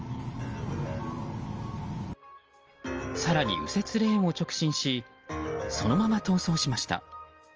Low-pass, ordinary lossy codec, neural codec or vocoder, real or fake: 7.2 kHz; Opus, 24 kbps; none; real